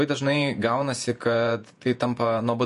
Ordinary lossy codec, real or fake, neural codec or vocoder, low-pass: MP3, 48 kbps; fake; vocoder, 48 kHz, 128 mel bands, Vocos; 14.4 kHz